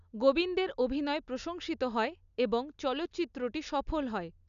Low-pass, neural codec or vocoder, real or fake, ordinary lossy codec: 7.2 kHz; none; real; none